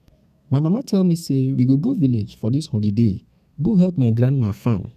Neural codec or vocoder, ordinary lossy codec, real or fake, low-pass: codec, 32 kHz, 1.9 kbps, SNAC; none; fake; 14.4 kHz